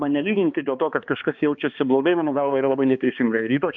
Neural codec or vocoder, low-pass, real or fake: codec, 16 kHz, 2 kbps, X-Codec, HuBERT features, trained on balanced general audio; 7.2 kHz; fake